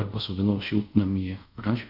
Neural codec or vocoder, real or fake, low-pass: codec, 24 kHz, 0.5 kbps, DualCodec; fake; 5.4 kHz